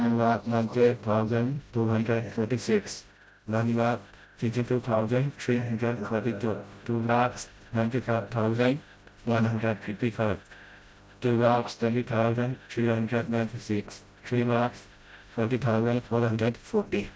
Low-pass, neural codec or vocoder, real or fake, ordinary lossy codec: none; codec, 16 kHz, 0.5 kbps, FreqCodec, smaller model; fake; none